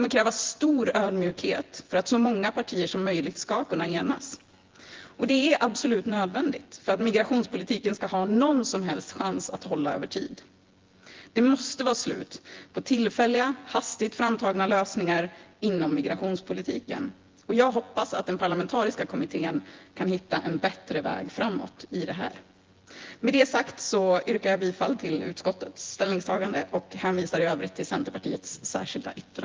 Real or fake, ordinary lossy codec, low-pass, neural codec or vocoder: fake; Opus, 16 kbps; 7.2 kHz; vocoder, 24 kHz, 100 mel bands, Vocos